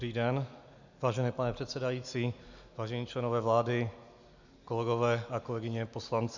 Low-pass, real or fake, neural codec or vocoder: 7.2 kHz; real; none